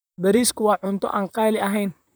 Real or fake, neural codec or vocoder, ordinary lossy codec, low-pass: fake; vocoder, 44.1 kHz, 128 mel bands, Pupu-Vocoder; none; none